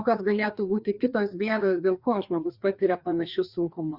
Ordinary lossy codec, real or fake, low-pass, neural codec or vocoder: Opus, 64 kbps; fake; 5.4 kHz; codec, 16 kHz, 2 kbps, FreqCodec, larger model